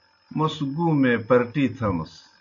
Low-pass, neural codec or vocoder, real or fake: 7.2 kHz; none; real